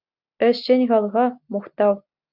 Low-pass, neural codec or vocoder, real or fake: 5.4 kHz; none; real